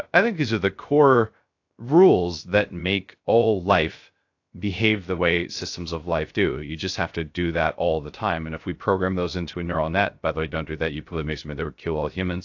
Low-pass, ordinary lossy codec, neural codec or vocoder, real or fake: 7.2 kHz; AAC, 48 kbps; codec, 16 kHz, 0.3 kbps, FocalCodec; fake